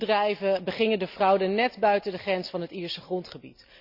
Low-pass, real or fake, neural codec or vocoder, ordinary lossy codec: 5.4 kHz; real; none; none